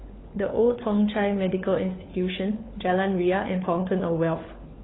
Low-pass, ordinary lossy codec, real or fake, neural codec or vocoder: 7.2 kHz; AAC, 16 kbps; fake; codec, 16 kHz, 4 kbps, FunCodec, trained on LibriTTS, 50 frames a second